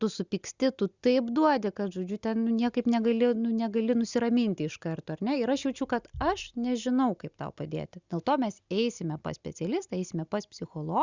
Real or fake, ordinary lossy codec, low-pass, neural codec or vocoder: real; Opus, 64 kbps; 7.2 kHz; none